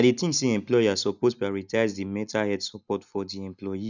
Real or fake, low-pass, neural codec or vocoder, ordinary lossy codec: real; 7.2 kHz; none; none